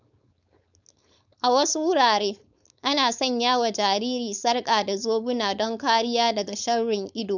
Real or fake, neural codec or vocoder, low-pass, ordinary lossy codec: fake; codec, 16 kHz, 4.8 kbps, FACodec; 7.2 kHz; none